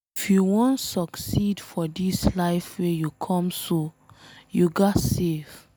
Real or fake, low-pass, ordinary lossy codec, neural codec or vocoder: real; none; none; none